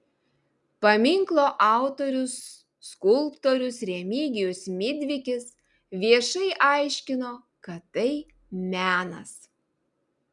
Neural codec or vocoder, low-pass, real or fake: none; 10.8 kHz; real